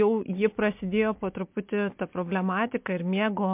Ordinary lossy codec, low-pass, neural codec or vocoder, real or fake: MP3, 32 kbps; 3.6 kHz; none; real